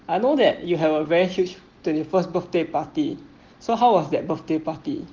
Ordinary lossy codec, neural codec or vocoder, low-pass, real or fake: Opus, 16 kbps; none; 7.2 kHz; real